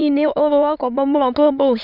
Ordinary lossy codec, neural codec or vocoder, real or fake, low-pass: none; autoencoder, 22.05 kHz, a latent of 192 numbers a frame, VITS, trained on many speakers; fake; 5.4 kHz